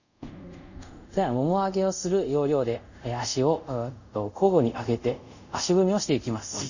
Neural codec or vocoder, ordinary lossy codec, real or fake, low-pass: codec, 24 kHz, 0.5 kbps, DualCodec; none; fake; 7.2 kHz